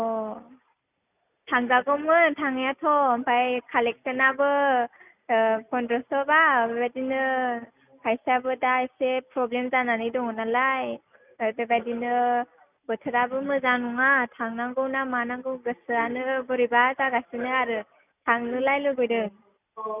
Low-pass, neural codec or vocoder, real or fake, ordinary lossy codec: 3.6 kHz; none; real; none